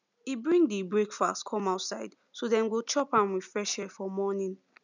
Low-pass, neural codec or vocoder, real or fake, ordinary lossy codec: 7.2 kHz; none; real; none